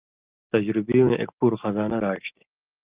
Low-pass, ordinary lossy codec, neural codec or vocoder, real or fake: 3.6 kHz; Opus, 24 kbps; none; real